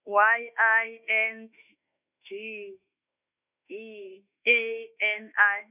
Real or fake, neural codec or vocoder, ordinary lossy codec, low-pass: fake; autoencoder, 48 kHz, 32 numbers a frame, DAC-VAE, trained on Japanese speech; none; 3.6 kHz